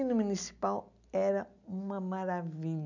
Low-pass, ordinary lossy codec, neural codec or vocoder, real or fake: 7.2 kHz; none; none; real